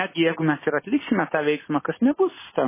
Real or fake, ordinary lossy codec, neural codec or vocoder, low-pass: real; MP3, 16 kbps; none; 3.6 kHz